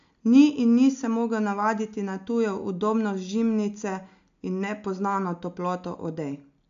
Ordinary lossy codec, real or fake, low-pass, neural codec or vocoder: AAC, 64 kbps; real; 7.2 kHz; none